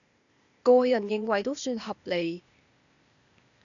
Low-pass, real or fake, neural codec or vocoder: 7.2 kHz; fake; codec, 16 kHz, 0.8 kbps, ZipCodec